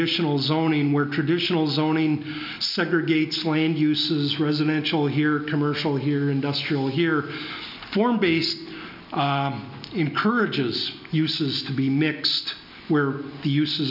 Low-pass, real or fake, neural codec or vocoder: 5.4 kHz; real; none